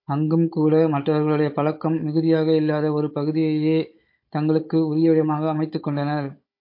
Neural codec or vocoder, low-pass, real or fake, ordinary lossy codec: codec, 16 kHz, 16 kbps, FunCodec, trained on Chinese and English, 50 frames a second; 5.4 kHz; fake; MP3, 32 kbps